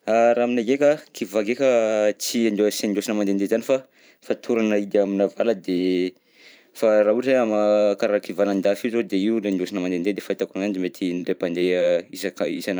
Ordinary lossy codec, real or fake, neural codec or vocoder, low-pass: none; fake; vocoder, 44.1 kHz, 128 mel bands every 512 samples, BigVGAN v2; none